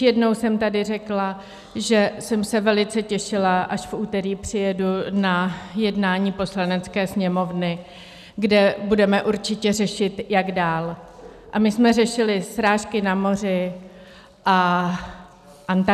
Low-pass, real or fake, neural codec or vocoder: 14.4 kHz; real; none